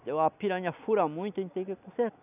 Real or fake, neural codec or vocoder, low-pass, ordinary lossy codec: real; none; 3.6 kHz; none